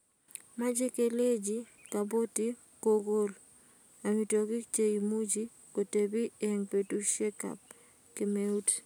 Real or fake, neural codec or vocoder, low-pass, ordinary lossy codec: real; none; none; none